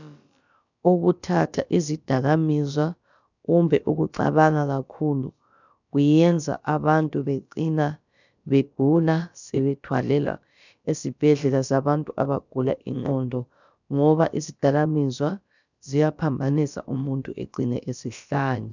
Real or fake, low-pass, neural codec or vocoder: fake; 7.2 kHz; codec, 16 kHz, about 1 kbps, DyCAST, with the encoder's durations